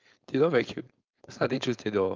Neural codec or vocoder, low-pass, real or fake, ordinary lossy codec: codec, 16 kHz, 4.8 kbps, FACodec; 7.2 kHz; fake; Opus, 24 kbps